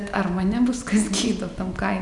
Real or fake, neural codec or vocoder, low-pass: real; none; 10.8 kHz